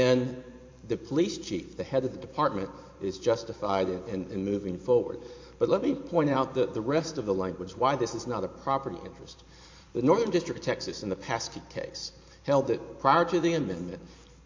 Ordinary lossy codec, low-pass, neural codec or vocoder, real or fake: MP3, 48 kbps; 7.2 kHz; vocoder, 44.1 kHz, 128 mel bands every 512 samples, BigVGAN v2; fake